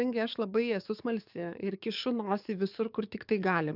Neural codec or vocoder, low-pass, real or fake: codec, 44.1 kHz, 7.8 kbps, DAC; 5.4 kHz; fake